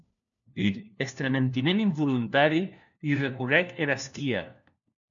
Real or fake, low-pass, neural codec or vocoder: fake; 7.2 kHz; codec, 16 kHz, 1 kbps, FunCodec, trained on LibriTTS, 50 frames a second